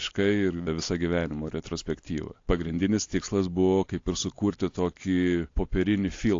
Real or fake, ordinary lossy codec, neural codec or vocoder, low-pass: real; AAC, 48 kbps; none; 7.2 kHz